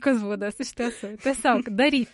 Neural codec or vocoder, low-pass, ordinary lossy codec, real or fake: autoencoder, 48 kHz, 128 numbers a frame, DAC-VAE, trained on Japanese speech; 19.8 kHz; MP3, 48 kbps; fake